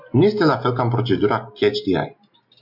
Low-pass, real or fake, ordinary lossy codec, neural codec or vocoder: 5.4 kHz; real; MP3, 48 kbps; none